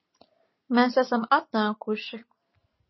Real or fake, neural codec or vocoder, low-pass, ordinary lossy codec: real; none; 7.2 kHz; MP3, 24 kbps